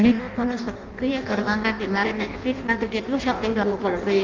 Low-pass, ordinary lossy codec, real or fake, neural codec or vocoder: 7.2 kHz; Opus, 24 kbps; fake; codec, 16 kHz in and 24 kHz out, 0.6 kbps, FireRedTTS-2 codec